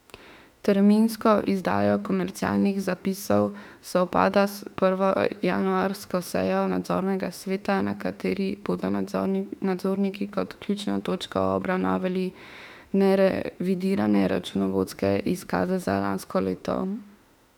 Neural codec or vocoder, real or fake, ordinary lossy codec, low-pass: autoencoder, 48 kHz, 32 numbers a frame, DAC-VAE, trained on Japanese speech; fake; none; 19.8 kHz